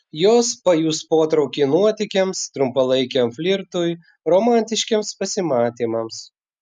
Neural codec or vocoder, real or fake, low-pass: none; real; 10.8 kHz